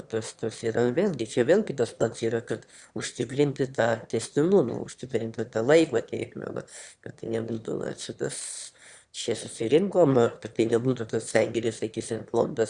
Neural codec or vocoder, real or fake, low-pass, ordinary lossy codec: autoencoder, 22.05 kHz, a latent of 192 numbers a frame, VITS, trained on one speaker; fake; 9.9 kHz; Opus, 64 kbps